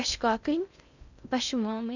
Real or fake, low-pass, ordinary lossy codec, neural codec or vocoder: fake; 7.2 kHz; none; codec, 16 kHz in and 24 kHz out, 0.6 kbps, FocalCodec, streaming, 2048 codes